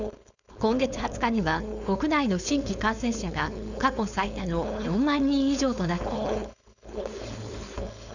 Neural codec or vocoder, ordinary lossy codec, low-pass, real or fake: codec, 16 kHz, 4.8 kbps, FACodec; none; 7.2 kHz; fake